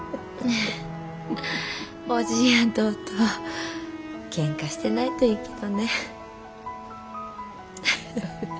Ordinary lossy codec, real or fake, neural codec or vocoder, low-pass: none; real; none; none